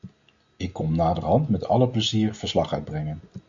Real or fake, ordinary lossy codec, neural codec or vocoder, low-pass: real; Opus, 64 kbps; none; 7.2 kHz